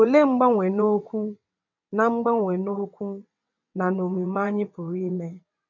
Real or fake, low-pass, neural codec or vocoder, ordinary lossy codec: fake; 7.2 kHz; vocoder, 22.05 kHz, 80 mel bands, WaveNeXt; none